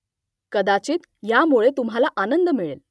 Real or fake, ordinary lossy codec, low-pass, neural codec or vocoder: real; none; none; none